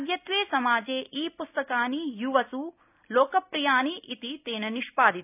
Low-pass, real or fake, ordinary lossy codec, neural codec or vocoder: 3.6 kHz; real; none; none